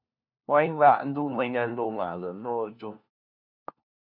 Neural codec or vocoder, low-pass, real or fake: codec, 16 kHz, 1 kbps, FunCodec, trained on LibriTTS, 50 frames a second; 5.4 kHz; fake